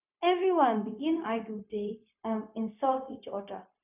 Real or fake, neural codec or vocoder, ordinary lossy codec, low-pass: fake; codec, 16 kHz, 0.4 kbps, LongCat-Audio-Codec; none; 3.6 kHz